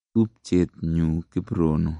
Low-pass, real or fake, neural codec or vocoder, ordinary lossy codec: 9.9 kHz; real; none; MP3, 48 kbps